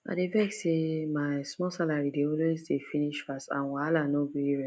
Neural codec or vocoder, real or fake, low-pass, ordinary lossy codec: none; real; none; none